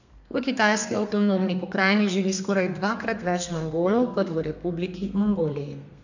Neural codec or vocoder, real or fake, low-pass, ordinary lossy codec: codec, 32 kHz, 1.9 kbps, SNAC; fake; 7.2 kHz; none